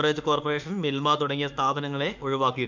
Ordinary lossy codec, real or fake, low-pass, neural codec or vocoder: none; fake; 7.2 kHz; autoencoder, 48 kHz, 32 numbers a frame, DAC-VAE, trained on Japanese speech